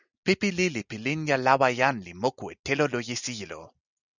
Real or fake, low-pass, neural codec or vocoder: real; 7.2 kHz; none